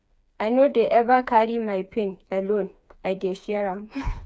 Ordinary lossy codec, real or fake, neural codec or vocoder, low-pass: none; fake; codec, 16 kHz, 4 kbps, FreqCodec, smaller model; none